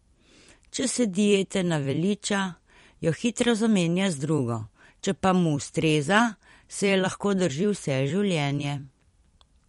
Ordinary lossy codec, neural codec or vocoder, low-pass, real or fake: MP3, 48 kbps; vocoder, 44.1 kHz, 128 mel bands every 256 samples, BigVGAN v2; 19.8 kHz; fake